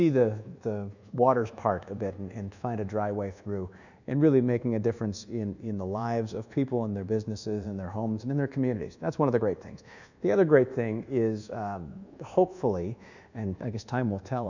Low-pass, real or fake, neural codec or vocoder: 7.2 kHz; fake; codec, 24 kHz, 1.2 kbps, DualCodec